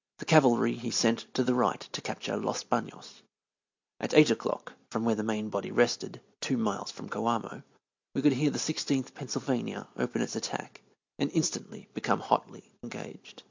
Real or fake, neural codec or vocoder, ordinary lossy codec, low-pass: real; none; MP3, 64 kbps; 7.2 kHz